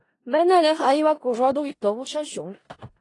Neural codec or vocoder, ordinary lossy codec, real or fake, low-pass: codec, 16 kHz in and 24 kHz out, 0.4 kbps, LongCat-Audio-Codec, four codebook decoder; AAC, 32 kbps; fake; 10.8 kHz